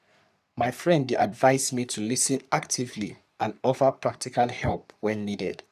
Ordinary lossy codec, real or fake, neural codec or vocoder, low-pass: none; fake; codec, 44.1 kHz, 3.4 kbps, Pupu-Codec; 14.4 kHz